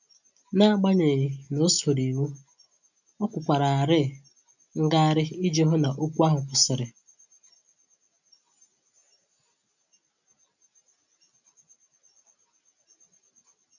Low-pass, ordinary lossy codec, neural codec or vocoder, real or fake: 7.2 kHz; none; none; real